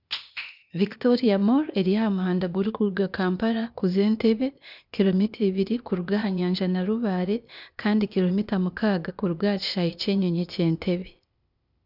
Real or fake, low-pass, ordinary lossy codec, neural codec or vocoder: fake; 5.4 kHz; none; codec, 16 kHz, 0.8 kbps, ZipCodec